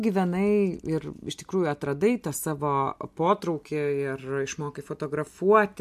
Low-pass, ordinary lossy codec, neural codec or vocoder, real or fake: 14.4 kHz; MP3, 64 kbps; none; real